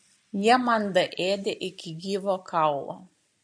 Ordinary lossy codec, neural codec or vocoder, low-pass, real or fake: MP3, 48 kbps; none; 9.9 kHz; real